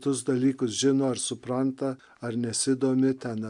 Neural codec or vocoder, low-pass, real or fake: none; 10.8 kHz; real